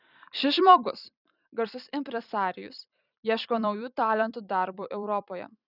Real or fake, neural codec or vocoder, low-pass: fake; vocoder, 44.1 kHz, 128 mel bands every 256 samples, BigVGAN v2; 5.4 kHz